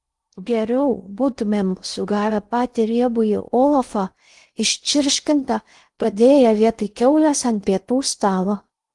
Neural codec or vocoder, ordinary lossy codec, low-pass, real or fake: codec, 16 kHz in and 24 kHz out, 0.6 kbps, FocalCodec, streaming, 2048 codes; Opus, 24 kbps; 10.8 kHz; fake